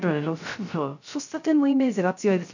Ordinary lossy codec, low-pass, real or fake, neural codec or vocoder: none; 7.2 kHz; fake; codec, 16 kHz, 0.3 kbps, FocalCodec